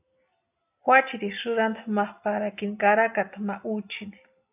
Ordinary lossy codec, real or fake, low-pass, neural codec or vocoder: AAC, 32 kbps; real; 3.6 kHz; none